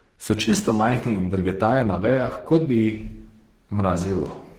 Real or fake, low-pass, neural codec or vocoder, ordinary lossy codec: fake; 19.8 kHz; codec, 44.1 kHz, 2.6 kbps, DAC; Opus, 16 kbps